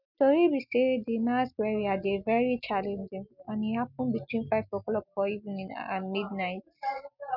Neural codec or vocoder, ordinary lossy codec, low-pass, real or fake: none; none; 5.4 kHz; real